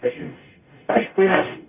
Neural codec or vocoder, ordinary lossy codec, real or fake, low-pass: codec, 44.1 kHz, 0.9 kbps, DAC; none; fake; 3.6 kHz